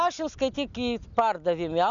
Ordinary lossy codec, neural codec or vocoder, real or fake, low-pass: MP3, 96 kbps; none; real; 7.2 kHz